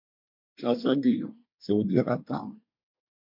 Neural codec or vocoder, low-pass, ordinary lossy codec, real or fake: codec, 24 kHz, 1 kbps, SNAC; 5.4 kHz; MP3, 48 kbps; fake